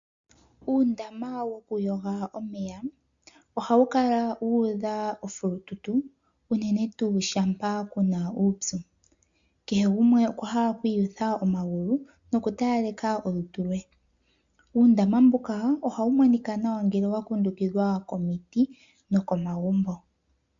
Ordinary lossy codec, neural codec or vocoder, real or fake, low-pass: MP3, 64 kbps; none; real; 7.2 kHz